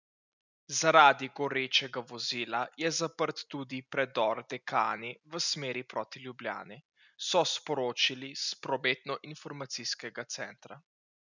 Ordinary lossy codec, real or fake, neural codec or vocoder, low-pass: none; real; none; 7.2 kHz